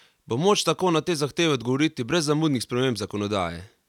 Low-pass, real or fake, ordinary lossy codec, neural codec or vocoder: 19.8 kHz; real; none; none